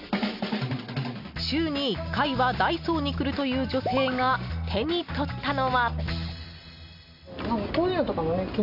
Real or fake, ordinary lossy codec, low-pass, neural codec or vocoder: real; none; 5.4 kHz; none